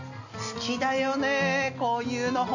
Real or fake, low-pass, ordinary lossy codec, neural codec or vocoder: real; 7.2 kHz; none; none